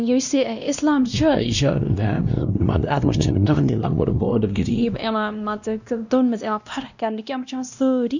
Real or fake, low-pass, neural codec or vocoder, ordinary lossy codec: fake; 7.2 kHz; codec, 16 kHz, 1 kbps, X-Codec, WavLM features, trained on Multilingual LibriSpeech; none